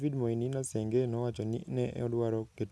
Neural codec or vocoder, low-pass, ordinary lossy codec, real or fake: none; none; none; real